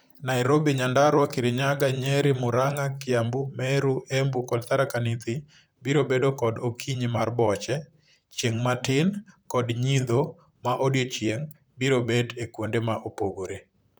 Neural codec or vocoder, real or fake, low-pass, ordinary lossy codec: vocoder, 44.1 kHz, 128 mel bands, Pupu-Vocoder; fake; none; none